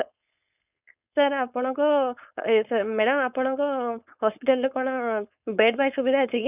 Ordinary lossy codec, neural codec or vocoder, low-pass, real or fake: none; codec, 16 kHz, 4.8 kbps, FACodec; 3.6 kHz; fake